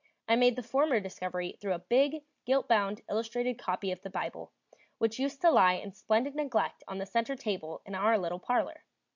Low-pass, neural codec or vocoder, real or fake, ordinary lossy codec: 7.2 kHz; none; real; MP3, 64 kbps